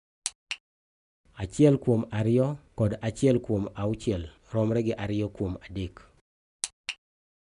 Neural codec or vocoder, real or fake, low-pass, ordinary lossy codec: none; real; 10.8 kHz; none